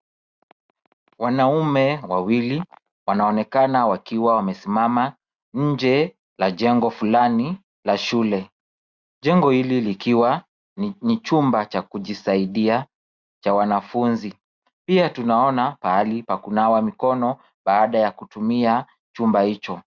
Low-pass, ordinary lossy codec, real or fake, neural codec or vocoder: 7.2 kHz; Opus, 64 kbps; real; none